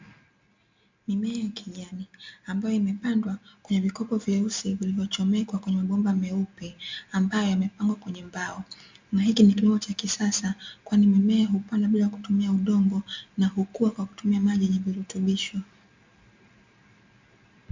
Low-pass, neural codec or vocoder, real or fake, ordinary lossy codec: 7.2 kHz; none; real; MP3, 64 kbps